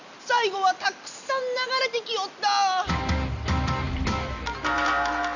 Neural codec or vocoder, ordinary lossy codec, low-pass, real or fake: none; none; 7.2 kHz; real